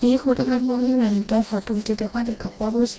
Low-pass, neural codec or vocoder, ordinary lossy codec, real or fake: none; codec, 16 kHz, 1 kbps, FreqCodec, smaller model; none; fake